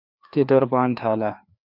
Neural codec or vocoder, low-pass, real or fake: codec, 16 kHz, 2 kbps, FreqCodec, larger model; 5.4 kHz; fake